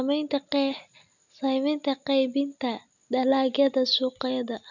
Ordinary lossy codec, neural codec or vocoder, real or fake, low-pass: none; none; real; 7.2 kHz